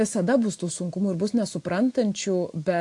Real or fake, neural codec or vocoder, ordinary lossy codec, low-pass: real; none; AAC, 64 kbps; 10.8 kHz